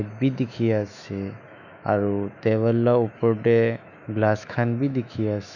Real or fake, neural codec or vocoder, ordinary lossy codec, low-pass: fake; autoencoder, 48 kHz, 128 numbers a frame, DAC-VAE, trained on Japanese speech; none; 7.2 kHz